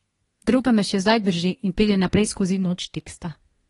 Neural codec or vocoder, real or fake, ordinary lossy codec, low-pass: codec, 24 kHz, 1 kbps, SNAC; fake; AAC, 32 kbps; 10.8 kHz